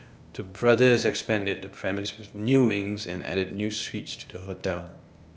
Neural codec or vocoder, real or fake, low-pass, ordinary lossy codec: codec, 16 kHz, 0.8 kbps, ZipCodec; fake; none; none